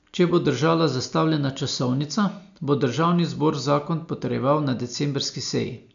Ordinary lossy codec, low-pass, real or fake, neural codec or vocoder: none; 7.2 kHz; real; none